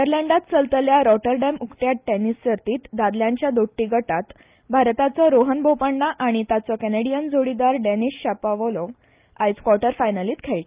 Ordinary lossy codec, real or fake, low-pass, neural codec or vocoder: Opus, 24 kbps; real; 3.6 kHz; none